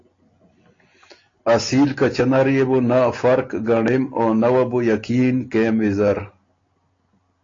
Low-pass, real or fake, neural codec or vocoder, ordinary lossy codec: 7.2 kHz; real; none; AAC, 32 kbps